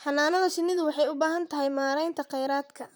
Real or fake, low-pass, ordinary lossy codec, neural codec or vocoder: fake; none; none; vocoder, 44.1 kHz, 128 mel bands, Pupu-Vocoder